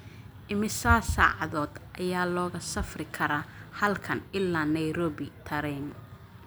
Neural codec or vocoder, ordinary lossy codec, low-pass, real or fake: none; none; none; real